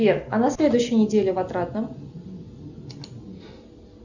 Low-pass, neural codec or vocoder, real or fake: 7.2 kHz; none; real